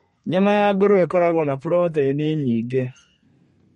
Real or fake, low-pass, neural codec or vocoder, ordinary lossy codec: fake; 14.4 kHz; codec, 32 kHz, 1.9 kbps, SNAC; MP3, 48 kbps